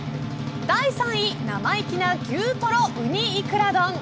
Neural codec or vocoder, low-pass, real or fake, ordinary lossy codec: none; none; real; none